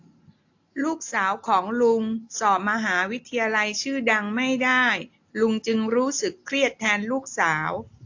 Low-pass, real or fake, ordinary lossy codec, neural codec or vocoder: 7.2 kHz; real; AAC, 48 kbps; none